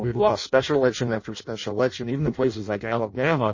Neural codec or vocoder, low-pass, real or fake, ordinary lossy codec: codec, 16 kHz in and 24 kHz out, 0.6 kbps, FireRedTTS-2 codec; 7.2 kHz; fake; MP3, 32 kbps